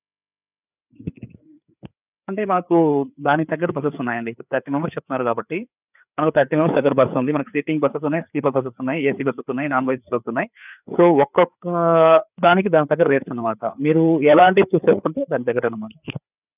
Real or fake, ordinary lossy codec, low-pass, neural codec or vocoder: fake; none; 3.6 kHz; codec, 16 kHz, 4 kbps, FreqCodec, larger model